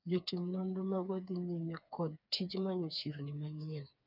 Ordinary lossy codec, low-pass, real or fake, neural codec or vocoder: none; 5.4 kHz; fake; codec, 16 kHz, 4 kbps, FreqCodec, smaller model